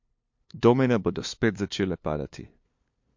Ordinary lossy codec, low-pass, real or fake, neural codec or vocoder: MP3, 48 kbps; 7.2 kHz; fake; codec, 16 kHz, 2 kbps, FunCodec, trained on LibriTTS, 25 frames a second